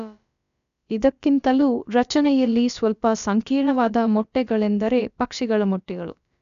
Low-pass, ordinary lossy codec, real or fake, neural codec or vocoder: 7.2 kHz; none; fake; codec, 16 kHz, about 1 kbps, DyCAST, with the encoder's durations